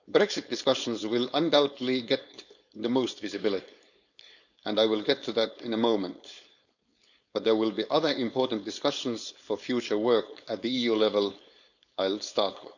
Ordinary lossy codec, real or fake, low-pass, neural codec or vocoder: none; fake; 7.2 kHz; codec, 16 kHz, 4.8 kbps, FACodec